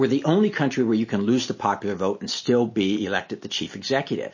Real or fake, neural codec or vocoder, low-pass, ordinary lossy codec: real; none; 7.2 kHz; MP3, 32 kbps